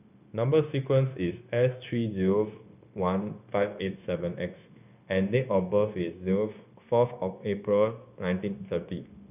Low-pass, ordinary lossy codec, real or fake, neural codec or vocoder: 3.6 kHz; none; fake; codec, 16 kHz, 0.9 kbps, LongCat-Audio-Codec